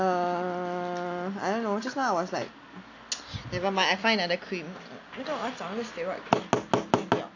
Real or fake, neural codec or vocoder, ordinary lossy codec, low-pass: real; none; AAC, 48 kbps; 7.2 kHz